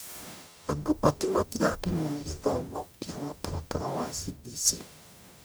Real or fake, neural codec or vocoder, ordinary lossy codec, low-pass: fake; codec, 44.1 kHz, 0.9 kbps, DAC; none; none